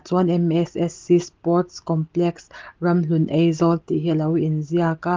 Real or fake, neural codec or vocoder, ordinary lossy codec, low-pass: real; none; Opus, 16 kbps; 7.2 kHz